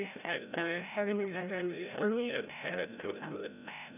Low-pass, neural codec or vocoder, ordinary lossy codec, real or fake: 3.6 kHz; codec, 16 kHz, 0.5 kbps, FreqCodec, larger model; none; fake